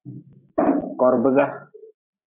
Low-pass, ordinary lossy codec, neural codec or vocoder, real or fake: 3.6 kHz; MP3, 32 kbps; vocoder, 44.1 kHz, 128 mel bands every 512 samples, BigVGAN v2; fake